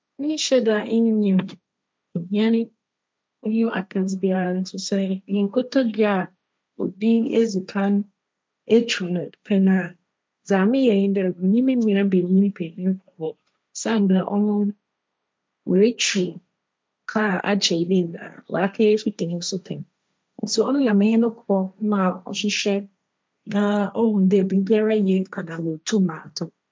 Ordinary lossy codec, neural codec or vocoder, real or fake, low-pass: none; codec, 16 kHz, 1.1 kbps, Voila-Tokenizer; fake; none